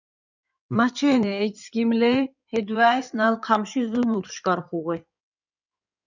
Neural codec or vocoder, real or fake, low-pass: codec, 16 kHz in and 24 kHz out, 2.2 kbps, FireRedTTS-2 codec; fake; 7.2 kHz